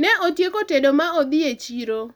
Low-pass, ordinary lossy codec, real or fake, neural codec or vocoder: none; none; real; none